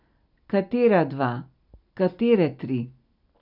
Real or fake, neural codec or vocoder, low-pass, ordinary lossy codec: real; none; 5.4 kHz; none